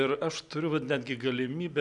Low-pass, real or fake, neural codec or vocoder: 10.8 kHz; real; none